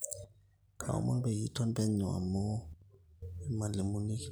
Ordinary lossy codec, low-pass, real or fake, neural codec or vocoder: none; none; real; none